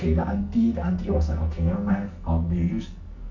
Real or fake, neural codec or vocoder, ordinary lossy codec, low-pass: fake; codec, 44.1 kHz, 2.6 kbps, SNAC; none; 7.2 kHz